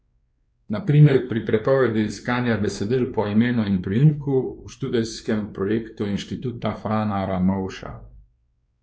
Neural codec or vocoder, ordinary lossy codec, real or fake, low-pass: codec, 16 kHz, 2 kbps, X-Codec, WavLM features, trained on Multilingual LibriSpeech; none; fake; none